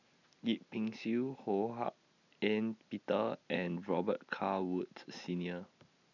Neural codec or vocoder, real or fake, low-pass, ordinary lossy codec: none; real; 7.2 kHz; none